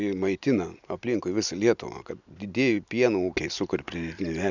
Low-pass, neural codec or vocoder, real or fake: 7.2 kHz; none; real